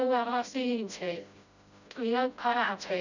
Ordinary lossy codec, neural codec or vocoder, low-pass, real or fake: none; codec, 16 kHz, 0.5 kbps, FreqCodec, smaller model; 7.2 kHz; fake